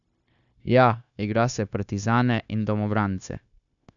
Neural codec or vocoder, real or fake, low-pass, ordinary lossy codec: codec, 16 kHz, 0.9 kbps, LongCat-Audio-Codec; fake; 7.2 kHz; none